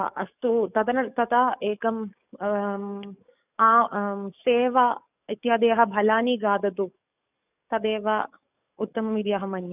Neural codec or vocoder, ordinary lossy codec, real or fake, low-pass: codec, 44.1 kHz, 7.8 kbps, DAC; none; fake; 3.6 kHz